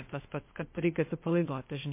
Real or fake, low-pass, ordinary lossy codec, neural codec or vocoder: fake; 3.6 kHz; MP3, 24 kbps; codec, 16 kHz, 0.8 kbps, ZipCodec